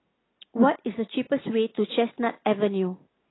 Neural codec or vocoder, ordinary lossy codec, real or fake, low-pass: none; AAC, 16 kbps; real; 7.2 kHz